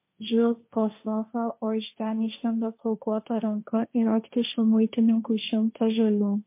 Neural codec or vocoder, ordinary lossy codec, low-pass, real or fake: codec, 16 kHz, 1.1 kbps, Voila-Tokenizer; MP3, 24 kbps; 3.6 kHz; fake